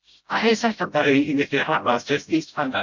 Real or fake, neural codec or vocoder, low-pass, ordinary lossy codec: fake; codec, 16 kHz, 0.5 kbps, FreqCodec, smaller model; 7.2 kHz; MP3, 64 kbps